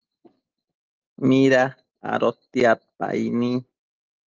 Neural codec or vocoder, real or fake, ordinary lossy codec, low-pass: none; real; Opus, 24 kbps; 7.2 kHz